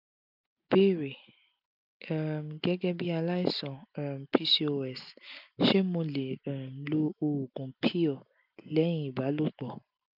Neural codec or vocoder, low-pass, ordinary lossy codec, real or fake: none; 5.4 kHz; none; real